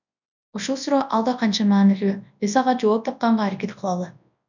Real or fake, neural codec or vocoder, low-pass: fake; codec, 24 kHz, 0.9 kbps, WavTokenizer, large speech release; 7.2 kHz